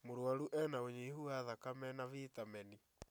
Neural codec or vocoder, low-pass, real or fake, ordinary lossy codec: none; none; real; none